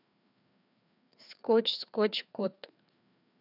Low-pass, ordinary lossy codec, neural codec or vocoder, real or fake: 5.4 kHz; none; codec, 16 kHz, 2 kbps, FreqCodec, larger model; fake